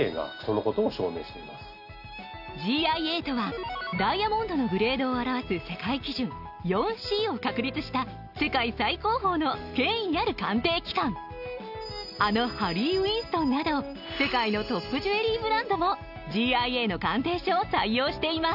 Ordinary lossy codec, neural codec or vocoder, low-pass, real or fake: none; none; 5.4 kHz; real